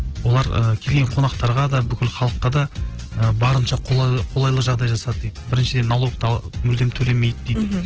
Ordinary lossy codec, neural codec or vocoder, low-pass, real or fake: Opus, 16 kbps; none; 7.2 kHz; real